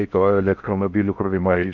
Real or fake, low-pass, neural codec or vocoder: fake; 7.2 kHz; codec, 16 kHz in and 24 kHz out, 0.6 kbps, FocalCodec, streaming, 4096 codes